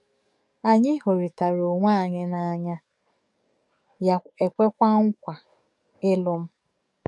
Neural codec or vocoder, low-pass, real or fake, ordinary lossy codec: codec, 44.1 kHz, 7.8 kbps, DAC; 10.8 kHz; fake; none